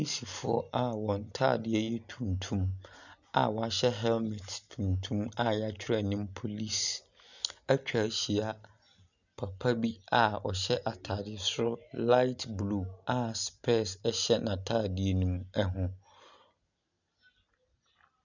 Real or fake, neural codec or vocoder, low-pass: real; none; 7.2 kHz